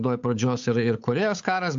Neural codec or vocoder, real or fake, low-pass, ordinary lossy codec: codec, 16 kHz, 8 kbps, FunCodec, trained on Chinese and English, 25 frames a second; fake; 7.2 kHz; AAC, 64 kbps